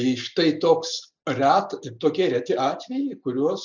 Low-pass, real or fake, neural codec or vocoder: 7.2 kHz; real; none